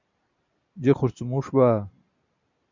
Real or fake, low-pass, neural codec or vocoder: real; 7.2 kHz; none